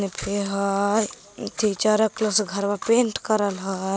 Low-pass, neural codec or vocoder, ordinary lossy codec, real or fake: none; none; none; real